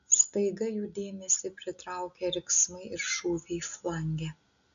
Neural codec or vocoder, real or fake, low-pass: none; real; 7.2 kHz